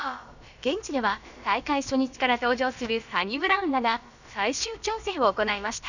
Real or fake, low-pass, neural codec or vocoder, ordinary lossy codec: fake; 7.2 kHz; codec, 16 kHz, about 1 kbps, DyCAST, with the encoder's durations; none